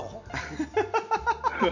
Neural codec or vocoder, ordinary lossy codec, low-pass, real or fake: none; none; 7.2 kHz; real